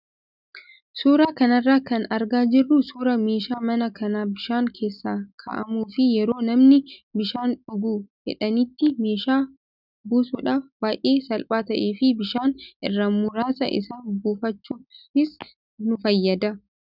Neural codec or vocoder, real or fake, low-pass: none; real; 5.4 kHz